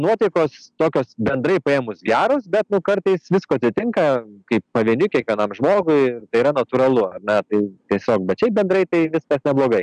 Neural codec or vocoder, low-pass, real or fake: none; 9.9 kHz; real